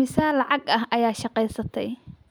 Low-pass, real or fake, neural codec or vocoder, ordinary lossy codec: none; real; none; none